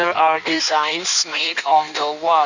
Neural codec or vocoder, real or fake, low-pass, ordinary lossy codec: codec, 16 kHz in and 24 kHz out, 1.1 kbps, FireRedTTS-2 codec; fake; 7.2 kHz; none